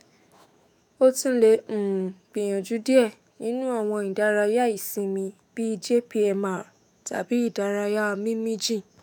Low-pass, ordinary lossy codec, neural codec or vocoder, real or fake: none; none; autoencoder, 48 kHz, 128 numbers a frame, DAC-VAE, trained on Japanese speech; fake